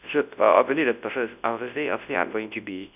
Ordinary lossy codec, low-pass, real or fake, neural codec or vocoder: none; 3.6 kHz; fake; codec, 24 kHz, 0.9 kbps, WavTokenizer, large speech release